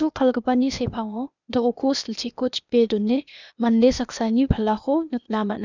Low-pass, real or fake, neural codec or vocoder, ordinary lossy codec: 7.2 kHz; fake; codec, 16 kHz, 0.8 kbps, ZipCodec; none